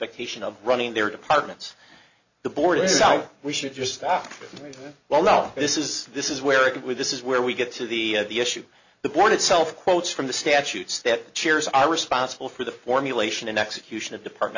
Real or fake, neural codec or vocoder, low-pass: real; none; 7.2 kHz